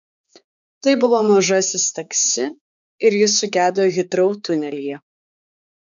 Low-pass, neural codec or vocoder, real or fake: 7.2 kHz; codec, 16 kHz, 4 kbps, X-Codec, HuBERT features, trained on balanced general audio; fake